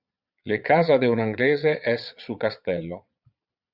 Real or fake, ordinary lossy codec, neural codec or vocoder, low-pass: fake; Opus, 64 kbps; vocoder, 22.05 kHz, 80 mel bands, Vocos; 5.4 kHz